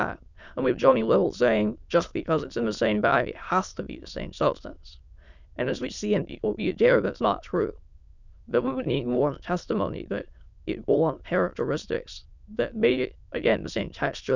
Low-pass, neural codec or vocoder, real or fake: 7.2 kHz; autoencoder, 22.05 kHz, a latent of 192 numbers a frame, VITS, trained on many speakers; fake